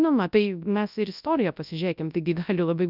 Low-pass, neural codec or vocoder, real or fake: 5.4 kHz; codec, 24 kHz, 0.9 kbps, WavTokenizer, large speech release; fake